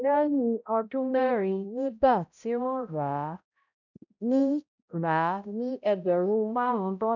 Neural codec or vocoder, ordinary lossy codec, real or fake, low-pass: codec, 16 kHz, 0.5 kbps, X-Codec, HuBERT features, trained on balanced general audio; none; fake; 7.2 kHz